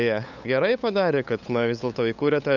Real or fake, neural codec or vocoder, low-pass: fake; codec, 16 kHz, 8 kbps, FunCodec, trained on LibriTTS, 25 frames a second; 7.2 kHz